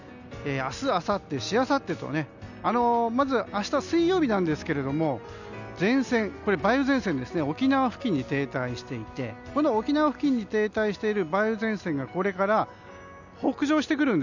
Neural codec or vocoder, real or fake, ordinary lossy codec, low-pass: none; real; none; 7.2 kHz